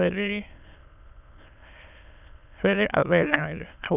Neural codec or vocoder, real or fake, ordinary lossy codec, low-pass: autoencoder, 22.05 kHz, a latent of 192 numbers a frame, VITS, trained on many speakers; fake; none; 3.6 kHz